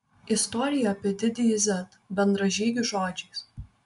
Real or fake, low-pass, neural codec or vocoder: real; 10.8 kHz; none